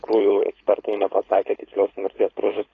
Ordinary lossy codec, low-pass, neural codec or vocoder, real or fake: AAC, 32 kbps; 7.2 kHz; codec, 16 kHz, 4.8 kbps, FACodec; fake